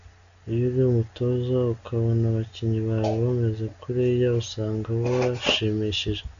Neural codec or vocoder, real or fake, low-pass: none; real; 7.2 kHz